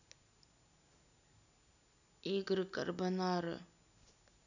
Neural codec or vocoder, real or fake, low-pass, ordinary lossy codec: none; real; 7.2 kHz; none